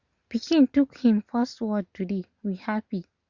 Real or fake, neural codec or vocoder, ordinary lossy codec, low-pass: real; none; Opus, 64 kbps; 7.2 kHz